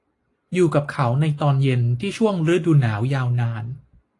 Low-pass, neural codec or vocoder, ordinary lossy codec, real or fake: 10.8 kHz; none; AAC, 48 kbps; real